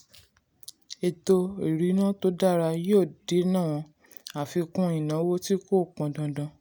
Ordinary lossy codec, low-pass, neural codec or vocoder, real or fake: none; none; none; real